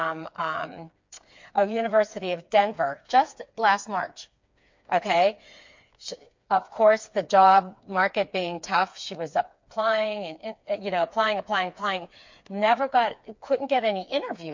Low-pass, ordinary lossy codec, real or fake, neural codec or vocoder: 7.2 kHz; MP3, 48 kbps; fake; codec, 16 kHz, 4 kbps, FreqCodec, smaller model